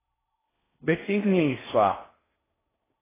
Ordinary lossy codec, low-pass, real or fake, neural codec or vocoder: AAC, 16 kbps; 3.6 kHz; fake; codec, 16 kHz in and 24 kHz out, 0.6 kbps, FocalCodec, streaming, 4096 codes